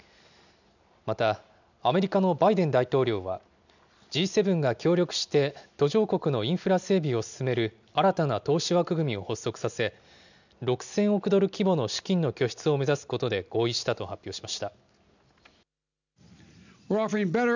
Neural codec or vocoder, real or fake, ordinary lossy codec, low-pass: none; real; none; 7.2 kHz